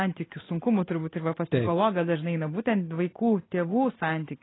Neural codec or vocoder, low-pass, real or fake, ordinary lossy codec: none; 7.2 kHz; real; AAC, 16 kbps